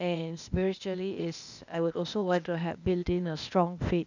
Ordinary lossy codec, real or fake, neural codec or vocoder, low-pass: none; fake; codec, 16 kHz, 0.8 kbps, ZipCodec; 7.2 kHz